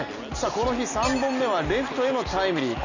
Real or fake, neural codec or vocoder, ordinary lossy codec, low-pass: real; none; none; 7.2 kHz